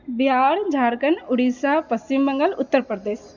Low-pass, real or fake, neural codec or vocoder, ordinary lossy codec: 7.2 kHz; real; none; none